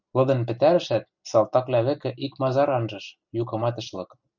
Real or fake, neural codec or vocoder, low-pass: real; none; 7.2 kHz